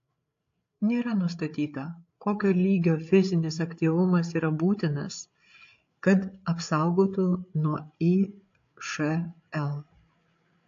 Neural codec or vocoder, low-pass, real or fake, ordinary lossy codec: codec, 16 kHz, 8 kbps, FreqCodec, larger model; 7.2 kHz; fake; AAC, 48 kbps